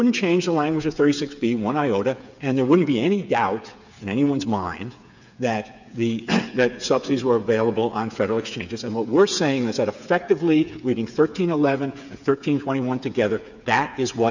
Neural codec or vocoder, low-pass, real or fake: codec, 16 kHz, 8 kbps, FreqCodec, smaller model; 7.2 kHz; fake